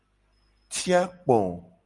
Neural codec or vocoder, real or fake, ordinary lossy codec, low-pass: none; real; Opus, 32 kbps; 10.8 kHz